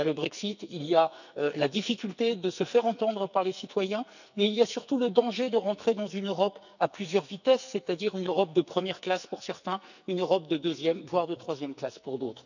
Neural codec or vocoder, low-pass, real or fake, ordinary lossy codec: codec, 44.1 kHz, 2.6 kbps, SNAC; 7.2 kHz; fake; none